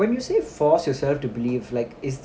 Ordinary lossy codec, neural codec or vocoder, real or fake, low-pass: none; none; real; none